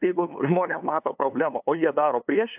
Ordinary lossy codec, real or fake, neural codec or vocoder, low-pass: AAC, 32 kbps; fake; codec, 16 kHz, 2 kbps, FunCodec, trained on LibriTTS, 25 frames a second; 3.6 kHz